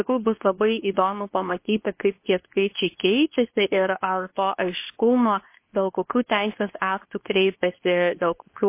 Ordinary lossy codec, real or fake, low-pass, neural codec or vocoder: MP3, 24 kbps; fake; 3.6 kHz; codec, 24 kHz, 0.9 kbps, WavTokenizer, medium speech release version 2